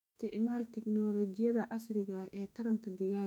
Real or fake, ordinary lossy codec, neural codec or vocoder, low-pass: fake; none; autoencoder, 48 kHz, 32 numbers a frame, DAC-VAE, trained on Japanese speech; 19.8 kHz